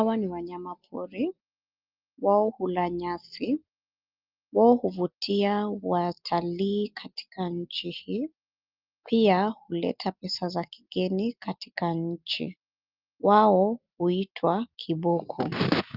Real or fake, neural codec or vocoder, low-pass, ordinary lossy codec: real; none; 5.4 kHz; Opus, 32 kbps